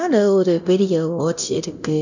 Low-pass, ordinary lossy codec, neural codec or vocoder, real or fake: 7.2 kHz; none; codec, 24 kHz, 0.9 kbps, DualCodec; fake